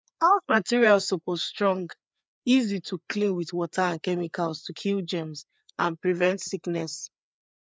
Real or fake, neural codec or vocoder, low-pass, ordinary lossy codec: fake; codec, 16 kHz, 4 kbps, FreqCodec, larger model; none; none